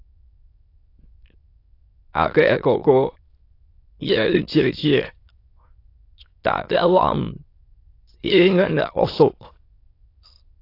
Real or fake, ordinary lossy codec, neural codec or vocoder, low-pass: fake; AAC, 32 kbps; autoencoder, 22.05 kHz, a latent of 192 numbers a frame, VITS, trained on many speakers; 5.4 kHz